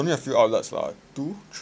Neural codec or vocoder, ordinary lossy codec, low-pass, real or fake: none; none; none; real